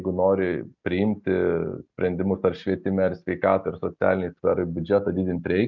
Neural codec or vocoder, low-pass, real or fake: none; 7.2 kHz; real